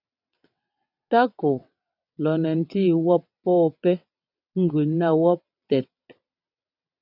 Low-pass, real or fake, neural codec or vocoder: 5.4 kHz; fake; vocoder, 22.05 kHz, 80 mel bands, WaveNeXt